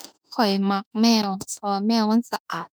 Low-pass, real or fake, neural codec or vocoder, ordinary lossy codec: none; fake; autoencoder, 48 kHz, 32 numbers a frame, DAC-VAE, trained on Japanese speech; none